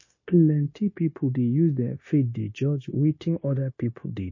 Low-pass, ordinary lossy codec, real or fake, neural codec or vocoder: 7.2 kHz; MP3, 32 kbps; fake; codec, 16 kHz, 0.9 kbps, LongCat-Audio-Codec